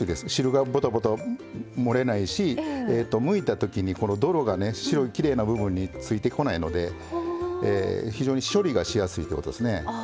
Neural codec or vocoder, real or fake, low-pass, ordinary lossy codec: none; real; none; none